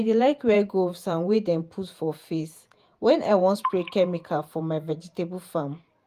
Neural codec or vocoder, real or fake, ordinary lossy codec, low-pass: vocoder, 48 kHz, 128 mel bands, Vocos; fake; Opus, 32 kbps; 14.4 kHz